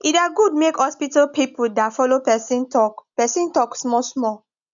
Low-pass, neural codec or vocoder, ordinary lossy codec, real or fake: 7.2 kHz; none; none; real